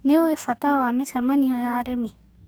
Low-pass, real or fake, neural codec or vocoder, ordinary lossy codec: none; fake; codec, 44.1 kHz, 2.6 kbps, DAC; none